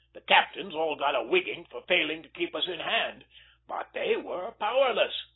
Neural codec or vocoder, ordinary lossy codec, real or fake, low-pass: none; AAC, 16 kbps; real; 7.2 kHz